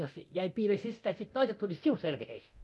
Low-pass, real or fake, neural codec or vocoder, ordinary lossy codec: none; fake; codec, 24 kHz, 0.9 kbps, DualCodec; none